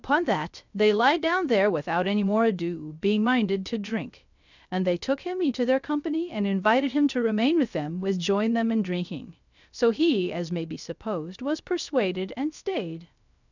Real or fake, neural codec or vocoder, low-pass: fake; codec, 16 kHz, 0.3 kbps, FocalCodec; 7.2 kHz